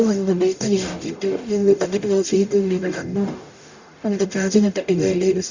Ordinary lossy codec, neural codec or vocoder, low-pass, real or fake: Opus, 64 kbps; codec, 44.1 kHz, 0.9 kbps, DAC; 7.2 kHz; fake